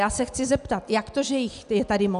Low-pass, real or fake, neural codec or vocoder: 10.8 kHz; real; none